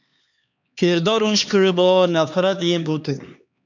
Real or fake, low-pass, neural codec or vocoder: fake; 7.2 kHz; codec, 16 kHz, 2 kbps, X-Codec, HuBERT features, trained on LibriSpeech